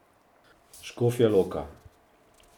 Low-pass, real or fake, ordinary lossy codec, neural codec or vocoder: 19.8 kHz; real; none; none